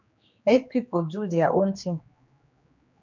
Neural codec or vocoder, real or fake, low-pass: codec, 16 kHz, 2 kbps, X-Codec, HuBERT features, trained on general audio; fake; 7.2 kHz